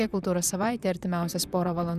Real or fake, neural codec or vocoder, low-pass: fake; vocoder, 44.1 kHz, 128 mel bands, Pupu-Vocoder; 14.4 kHz